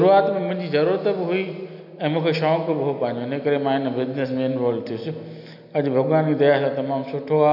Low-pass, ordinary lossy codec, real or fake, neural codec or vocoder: 5.4 kHz; none; real; none